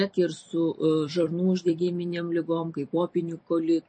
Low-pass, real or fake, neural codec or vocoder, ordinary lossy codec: 9.9 kHz; fake; vocoder, 44.1 kHz, 128 mel bands every 256 samples, BigVGAN v2; MP3, 32 kbps